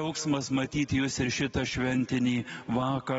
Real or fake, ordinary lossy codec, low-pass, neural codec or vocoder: real; AAC, 24 kbps; 19.8 kHz; none